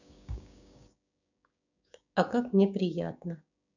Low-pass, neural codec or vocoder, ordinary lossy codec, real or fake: 7.2 kHz; codec, 16 kHz, 6 kbps, DAC; none; fake